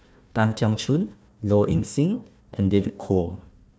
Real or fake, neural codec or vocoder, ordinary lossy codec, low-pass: fake; codec, 16 kHz, 1 kbps, FunCodec, trained on Chinese and English, 50 frames a second; none; none